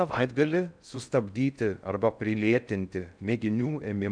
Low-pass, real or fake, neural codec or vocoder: 9.9 kHz; fake; codec, 16 kHz in and 24 kHz out, 0.6 kbps, FocalCodec, streaming, 4096 codes